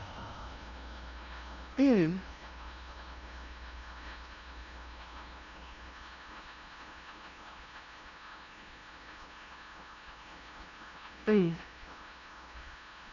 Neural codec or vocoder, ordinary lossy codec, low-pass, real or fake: codec, 16 kHz, 0.5 kbps, FunCodec, trained on LibriTTS, 25 frames a second; none; 7.2 kHz; fake